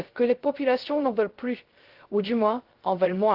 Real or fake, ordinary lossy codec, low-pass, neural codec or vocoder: fake; Opus, 16 kbps; 5.4 kHz; codec, 16 kHz, 0.3 kbps, FocalCodec